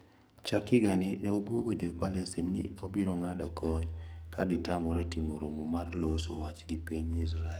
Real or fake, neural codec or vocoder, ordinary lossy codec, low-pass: fake; codec, 44.1 kHz, 2.6 kbps, SNAC; none; none